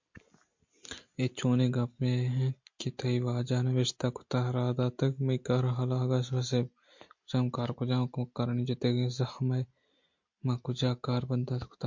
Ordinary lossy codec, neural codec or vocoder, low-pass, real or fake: MP3, 48 kbps; none; 7.2 kHz; real